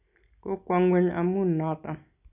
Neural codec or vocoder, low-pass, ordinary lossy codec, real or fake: none; 3.6 kHz; none; real